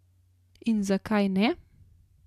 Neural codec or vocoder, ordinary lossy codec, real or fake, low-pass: none; MP3, 96 kbps; real; 14.4 kHz